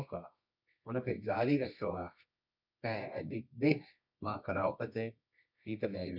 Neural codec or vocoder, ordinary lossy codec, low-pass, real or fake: codec, 24 kHz, 0.9 kbps, WavTokenizer, medium music audio release; none; 5.4 kHz; fake